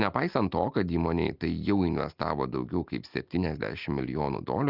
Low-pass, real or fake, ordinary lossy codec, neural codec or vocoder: 5.4 kHz; real; Opus, 24 kbps; none